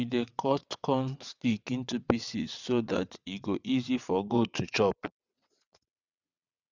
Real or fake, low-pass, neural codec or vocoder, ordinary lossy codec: fake; 7.2 kHz; vocoder, 22.05 kHz, 80 mel bands, WaveNeXt; Opus, 64 kbps